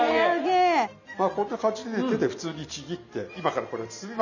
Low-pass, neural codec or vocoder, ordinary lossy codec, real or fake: 7.2 kHz; none; none; real